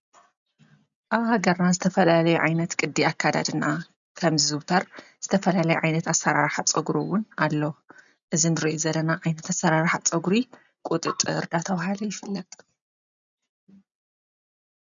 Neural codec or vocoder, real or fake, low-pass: none; real; 7.2 kHz